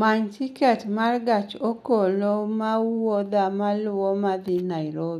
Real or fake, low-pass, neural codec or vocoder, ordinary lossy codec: real; 14.4 kHz; none; none